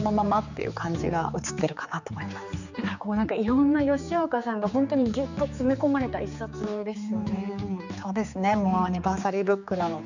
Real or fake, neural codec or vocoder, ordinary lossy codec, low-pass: fake; codec, 16 kHz, 4 kbps, X-Codec, HuBERT features, trained on general audio; none; 7.2 kHz